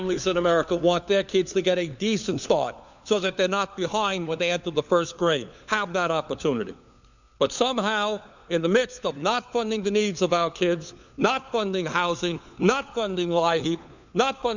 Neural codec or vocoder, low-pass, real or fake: codec, 16 kHz, 4 kbps, FunCodec, trained on LibriTTS, 50 frames a second; 7.2 kHz; fake